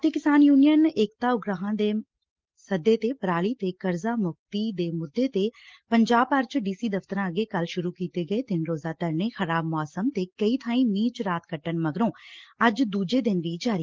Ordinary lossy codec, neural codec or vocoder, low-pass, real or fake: Opus, 16 kbps; none; 7.2 kHz; real